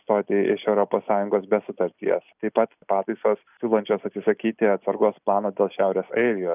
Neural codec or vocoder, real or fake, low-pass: none; real; 3.6 kHz